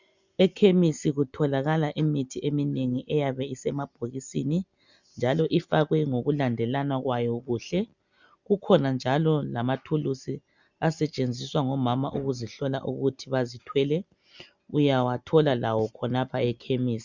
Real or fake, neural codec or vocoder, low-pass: real; none; 7.2 kHz